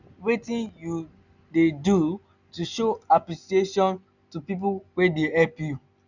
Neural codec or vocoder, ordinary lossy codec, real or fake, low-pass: none; none; real; 7.2 kHz